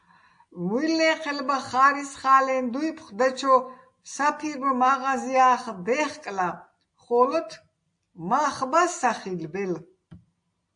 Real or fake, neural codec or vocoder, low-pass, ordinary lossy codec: real; none; 9.9 kHz; AAC, 64 kbps